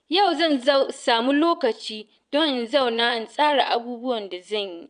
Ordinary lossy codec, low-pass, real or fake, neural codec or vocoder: none; 9.9 kHz; fake; vocoder, 22.05 kHz, 80 mel bands, Vocos